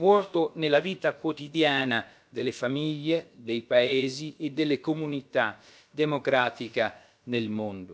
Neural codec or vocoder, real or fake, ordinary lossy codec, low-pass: codec, 16 kHz, about 1 kbps, DyCAST, with the encoder's durations; fake; none; none